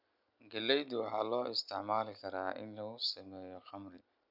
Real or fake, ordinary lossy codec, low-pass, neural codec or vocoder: real; none; 5.4 kHz; none